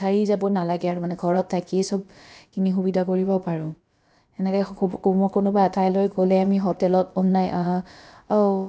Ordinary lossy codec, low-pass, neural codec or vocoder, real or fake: none; none; codec, 16 kHz, about 1 kbps, DyCAST, with the encoder's durations; fake